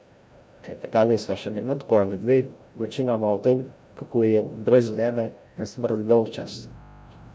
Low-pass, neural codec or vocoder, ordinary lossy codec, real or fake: none; codec, 16 kHz, 0.5 kbps, FreqCodec, larger model; none; fake